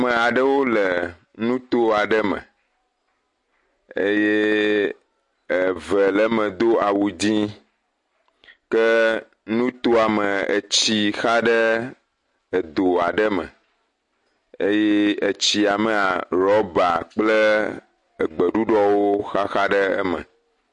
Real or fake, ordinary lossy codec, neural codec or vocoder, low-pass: real; MP3, 48 kbps; none; 10.8 kHz